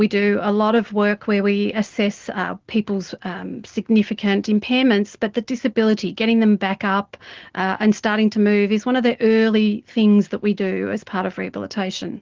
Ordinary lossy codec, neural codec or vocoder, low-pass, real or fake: Opus, 16 kbps; none; 7.2 kHz; real